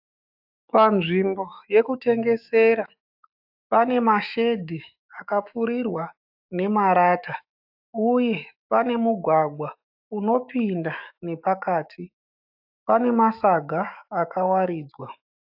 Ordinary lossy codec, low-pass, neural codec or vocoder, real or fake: AAC, 48 kbps; 5.4 kHz; autoencoder, 48 kHz, 128 numbers a frame, DAC-VAE, trained on Japanese speech; fake